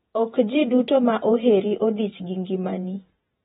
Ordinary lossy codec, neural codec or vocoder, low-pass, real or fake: AAC, 16 kbps; vocoder, 48 kHz, 128 mel bands, Vocos; 19.8 kHz; fake